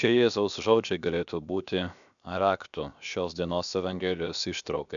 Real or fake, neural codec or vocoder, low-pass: fake; codec, 16 kHz, 0.7 kbps, FocalCodec; 7.2 kHz